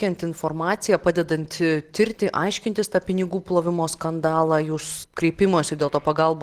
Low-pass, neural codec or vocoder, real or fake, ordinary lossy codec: 14.4 kHz; none; real; Opus, 16 kbps